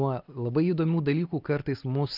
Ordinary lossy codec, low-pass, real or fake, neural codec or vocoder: Opus, 32 kbps; 5.4 kHz; real; none